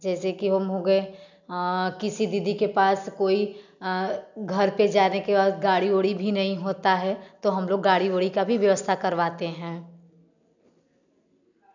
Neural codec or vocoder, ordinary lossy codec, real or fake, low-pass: none; none; real; 7.2 kHz